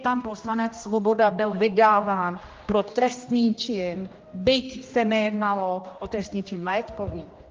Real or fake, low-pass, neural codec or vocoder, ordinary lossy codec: fake; 7.2 kHz; codec, 16 kHz, 1 kbps, X-Codec, HuBERT features, trained on general audio; Opus, 24 kbps